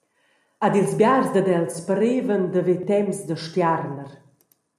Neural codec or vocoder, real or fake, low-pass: none; real; 14.4 kHz